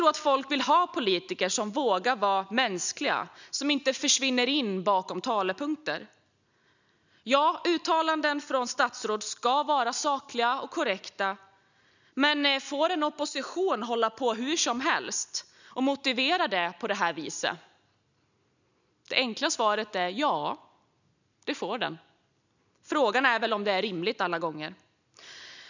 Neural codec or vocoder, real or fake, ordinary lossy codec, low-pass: none; real; none; 7.2 kHz